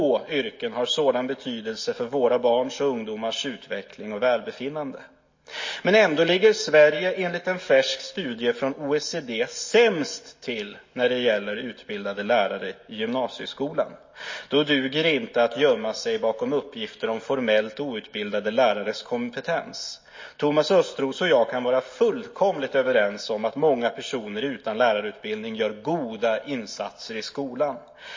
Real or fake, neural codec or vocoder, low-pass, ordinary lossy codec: real; none; 7.2 kHz; MP3, 32 kbps